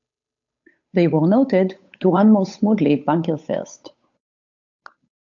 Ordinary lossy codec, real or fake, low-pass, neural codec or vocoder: AAC, 64 kbps; fake; 7.2 kHz; codec, 16 kHz, 8 kbps, FunCodec, trained on Chinese and English, 25 frames a second